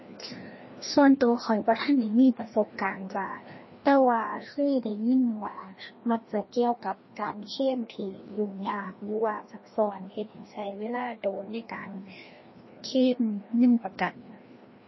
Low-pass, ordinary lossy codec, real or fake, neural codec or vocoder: 7.2 kHz; MP3, 24 kbps; fake; codec, 16 kHz, 1 kbps, FreqCodec, larger model